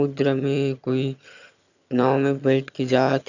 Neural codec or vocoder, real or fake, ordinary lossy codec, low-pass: vocoder, 22.05 kHz, 80 mel bands, HiFi-GAN; fake; none; 7.2 kHz